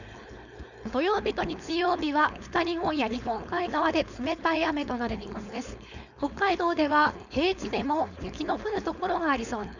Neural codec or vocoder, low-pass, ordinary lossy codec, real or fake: codec, 16 kHz, 4.8 kbps, FACodec; 7.2 kHz; none; fake